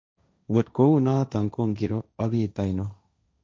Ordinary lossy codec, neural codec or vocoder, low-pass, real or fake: none; codec, 16 kHz, 1.1 kbps, Voila-Tokenizer; none; fake